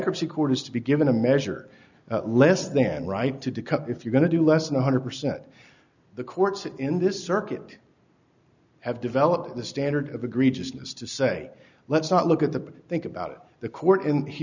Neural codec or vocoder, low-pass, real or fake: none; 7.2 kHz; real